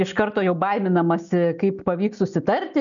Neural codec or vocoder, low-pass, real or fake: none; 7.2 kHz; real